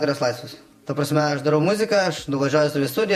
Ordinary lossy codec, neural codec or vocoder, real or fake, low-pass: MP3, 64 kbps; vocoder, 48 kHz, 128 mel bands, Vocos; fake; 14.4 kHz